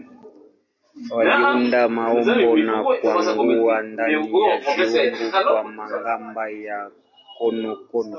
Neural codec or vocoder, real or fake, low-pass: none; real; 7.2 kHz